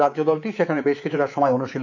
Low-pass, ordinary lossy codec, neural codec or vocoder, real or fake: 7.2 kHz; none; codec, 24 kHz, 3.1 kbps, DualCodec; fake